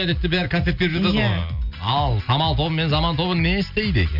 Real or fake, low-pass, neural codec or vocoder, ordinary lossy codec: real; 5.4 kHz; none; none